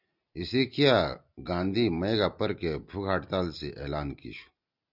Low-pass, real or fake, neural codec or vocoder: 5.4 kHz; real; none